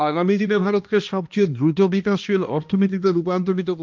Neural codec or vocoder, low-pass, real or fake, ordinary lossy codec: codec, 16 kHz, 1 kbps, X-Codec, HuBERT features, trained on balanced general audio; 7.2 kHz; fake; Opus, 24 kbps